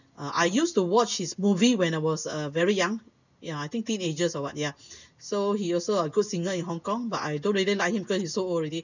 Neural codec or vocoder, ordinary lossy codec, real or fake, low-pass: none; none; real; 7.2 kHz